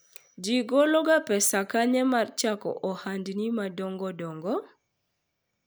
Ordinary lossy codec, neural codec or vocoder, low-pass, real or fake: none; none; none; real